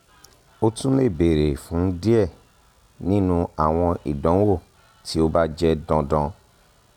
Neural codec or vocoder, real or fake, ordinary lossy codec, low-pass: none; real; none; 19.8 kHz